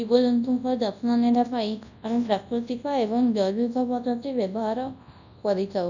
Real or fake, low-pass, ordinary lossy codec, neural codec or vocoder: fake; 7.2 kHz; none; codec, 24 kHz, 0.9 kbps, WavTokenizer, large speech release